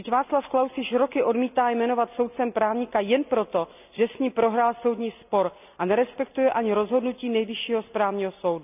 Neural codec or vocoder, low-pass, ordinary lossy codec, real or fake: none; 3.6 kHz; none; real